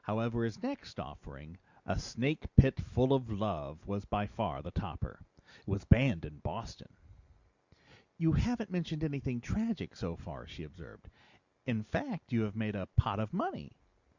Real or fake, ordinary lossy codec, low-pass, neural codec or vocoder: real; Opus, 64 kbps; 7.2 kHz; none